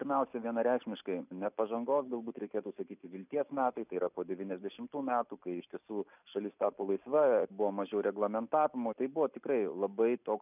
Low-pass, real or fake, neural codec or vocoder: 3.6 kHz; real; none